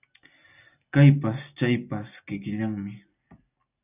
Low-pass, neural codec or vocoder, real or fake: 3.6 kHz; none; real